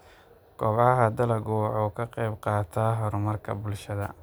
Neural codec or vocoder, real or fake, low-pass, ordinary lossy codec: none; real; none; none